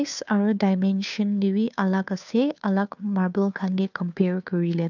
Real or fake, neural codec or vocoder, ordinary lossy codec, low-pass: fake; codec, 16 kHz, 2 kbps, FunCodec, trained on Chinese and English, 25 frames a second; none; 7.2 kHz